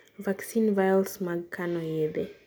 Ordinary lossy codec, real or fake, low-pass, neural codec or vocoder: none; real; none; none